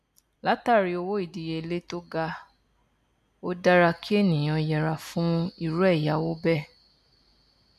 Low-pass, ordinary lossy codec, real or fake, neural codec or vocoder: 14.4 kHz; none; real; none